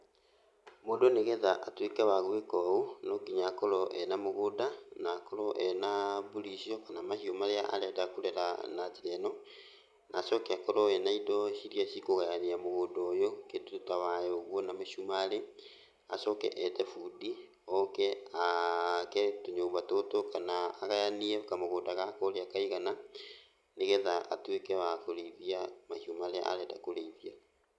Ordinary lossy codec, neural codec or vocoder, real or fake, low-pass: none; none; real; none